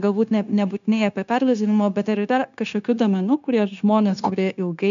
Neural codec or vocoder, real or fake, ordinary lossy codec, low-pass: codec, 16 kHz, 0.9 kbps, LongCat-Audio-Codec; fake; AAC, 96 kbps; 7.2 kHz